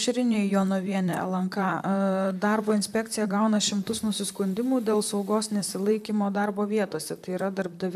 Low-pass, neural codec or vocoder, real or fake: 14.4 kHz; vocoder, 44.1 kHz, 128 mel bands, Pupu-Vocoder; fake